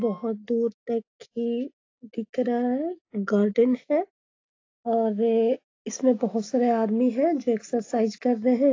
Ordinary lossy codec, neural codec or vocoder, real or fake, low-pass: AAC, 32 kbps; none; real; 7.2 kHz